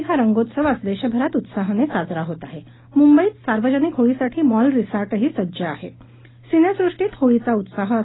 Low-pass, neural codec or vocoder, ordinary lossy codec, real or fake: 7.2 kHz; none; AAC, 16 kbps; real